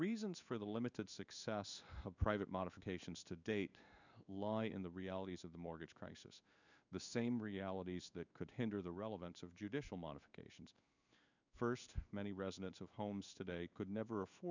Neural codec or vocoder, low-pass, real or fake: codec, 16 kHz in and 24 kHz out, 1 kbps, XY-Tokenizer; 7.2 kHz; fake